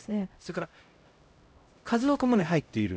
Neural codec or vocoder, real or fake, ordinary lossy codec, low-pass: codec, 16 kHz, 0.5 kbps, X-Codec, HuBERT features, trained on LibriSpeech; fake; none; none